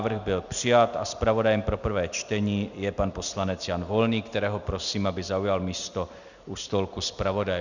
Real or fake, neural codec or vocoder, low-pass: real; none; 7.2 kHz